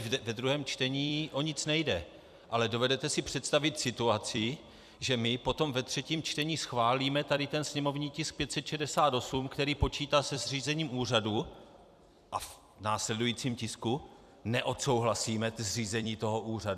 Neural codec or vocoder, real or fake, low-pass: vocoder, 44.1 kHz, 128 mel bands every 256 samples, BigVGAN v2; fake; 14.4 kHz